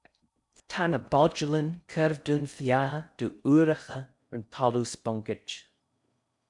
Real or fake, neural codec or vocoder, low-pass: fake; codec, 16 kHz in and 24 kHz out, 0.6 kbps, FocalCodec, streaming, 4096 codes; 10.8 kHz